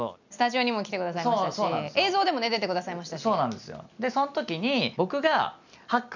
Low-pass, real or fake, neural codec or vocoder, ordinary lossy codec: 7.2 kHz; real; none; none